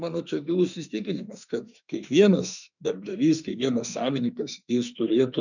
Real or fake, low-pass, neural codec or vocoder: fake; 7.2 kHz; autoencoder, 48 kHz, 32 numbers a frame, DAC-VAE, trained on Japanese speech